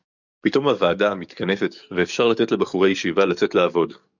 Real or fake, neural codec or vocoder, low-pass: fake; codec, 44.1 kHz, 7.8 kbps, DAC; 7.2 kHz